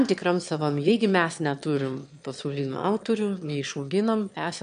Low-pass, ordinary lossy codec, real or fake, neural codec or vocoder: 9.9 kHz; MP3, 64 kbps; fake; autoencoder, 22.05 kHz, a latent of 192 numbers a frame, VITS, trained on one speaker